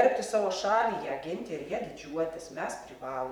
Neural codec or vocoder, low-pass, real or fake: vocoder, 44.1 kHz, 128 mel bands, Pupu-Vocoder; 19.8 kHz; fake